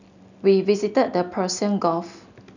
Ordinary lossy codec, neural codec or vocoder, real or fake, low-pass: none; none; real; 7.2 kHz